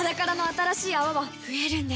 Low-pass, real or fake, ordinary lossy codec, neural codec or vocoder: none; real; none; none